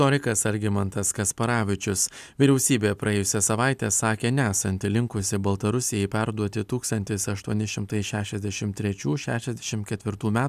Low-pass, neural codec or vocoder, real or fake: 14.4 kHz; none; real